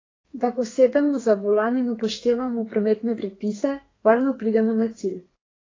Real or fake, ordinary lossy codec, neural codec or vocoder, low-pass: fake; AAC, 32 kbps; codec, 32 kHz, 1.9 kbps, SNAC; 7.2 kHz